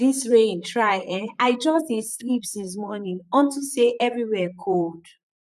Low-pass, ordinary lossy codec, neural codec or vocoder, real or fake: 14.4 kHz; none; vocoder, 44.1 kHz, 128 mel bands, Pupu-Vocoder; fake